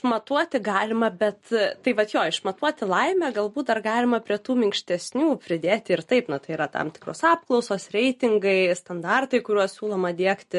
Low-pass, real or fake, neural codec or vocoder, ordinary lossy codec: 14.4 kHz; real; none; MP3, 48 kbps